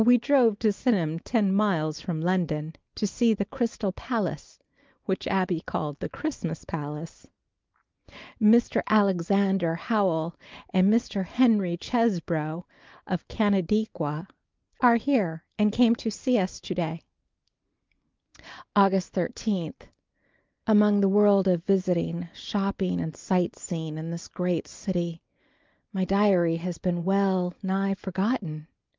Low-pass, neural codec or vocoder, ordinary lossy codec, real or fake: 7.2 kHz; none; Opus, 32 kbps; real